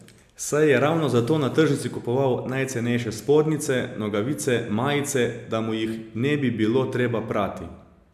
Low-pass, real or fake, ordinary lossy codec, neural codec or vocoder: 14.4 kHz; real; MP3, 96 kbps; none